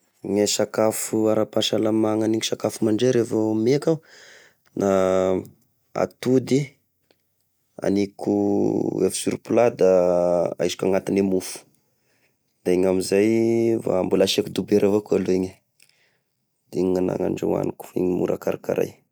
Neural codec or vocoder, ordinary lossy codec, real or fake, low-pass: none; none; real; none